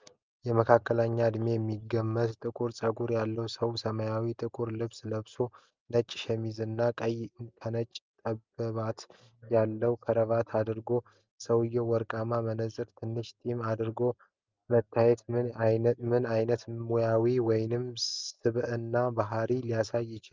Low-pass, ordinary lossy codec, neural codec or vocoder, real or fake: 7.2 kHz; Opus, 24 kbps; none; real